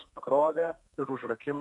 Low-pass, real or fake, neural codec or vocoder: 10.8 kHz; fake; codec, 32 kHz, 1.9 kbps, SNAC